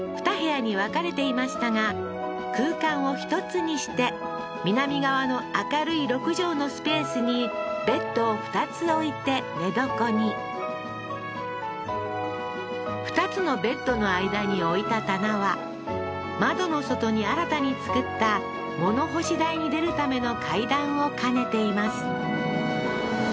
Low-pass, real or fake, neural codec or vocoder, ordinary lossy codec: none; real; none; none